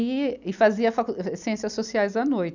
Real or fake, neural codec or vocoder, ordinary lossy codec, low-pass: real; none; none; 7.2 kHz